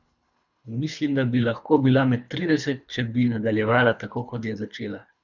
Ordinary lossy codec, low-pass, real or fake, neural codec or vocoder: none; 7.2 kHz; fake; codec, 24 kHz, 3 kbps, HILCodec